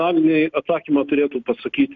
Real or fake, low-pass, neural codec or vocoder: real; 7.2 kHz; none